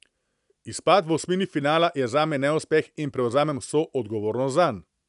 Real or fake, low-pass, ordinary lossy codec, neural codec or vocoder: real; 10.8 kHz; none; none